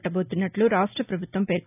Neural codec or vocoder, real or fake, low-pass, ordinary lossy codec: none; real; 3.6 kHz; none